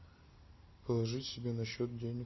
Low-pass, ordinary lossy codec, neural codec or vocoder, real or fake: 7.2 kHz; MP3, 24 kbps; none; real